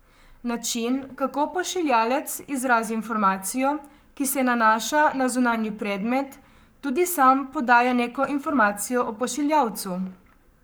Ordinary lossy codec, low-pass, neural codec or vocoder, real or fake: none; none; codec, 44.1 kHz, 7.8 kbps, Pupu-Codec; fake